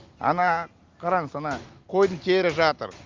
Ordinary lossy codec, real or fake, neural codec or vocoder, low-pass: Opus, 32 kbps; real; none; 7.2 kHz